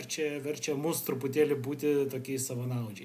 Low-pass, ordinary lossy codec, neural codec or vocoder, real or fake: 14.4 kHz; AAC, 96 kbps; none; real